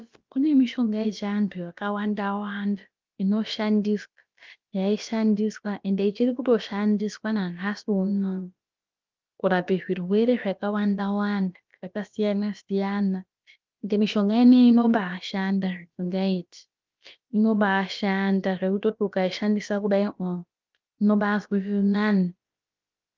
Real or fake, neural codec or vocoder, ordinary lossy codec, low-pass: fake; codec, 16 kHz, about 1 kbps, DyCAST, with the encoder's durations; Opus, 24 kbps; 7.2 kHz